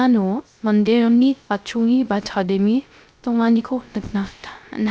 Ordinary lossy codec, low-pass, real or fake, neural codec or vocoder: none; none; fake; codec, 16 kHz, 0.3 kbps, FocalCodec